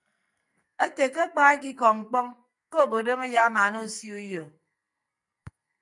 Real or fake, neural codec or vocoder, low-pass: fake; codec, 44.1 kHz, 2.6 kbps, SNAC; 10.8 kHz